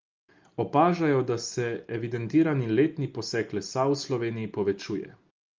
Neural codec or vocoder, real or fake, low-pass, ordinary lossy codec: none; real; 7.2 kHz; Opus, 32 kbps